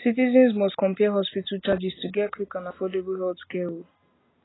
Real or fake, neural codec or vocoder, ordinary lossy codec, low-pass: real; none; AAC, 16 kbps; 7.2 kHz